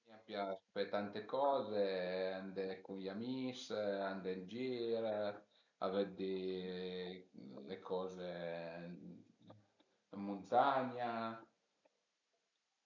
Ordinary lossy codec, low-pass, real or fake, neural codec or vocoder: none; 7.2 kHz; real; none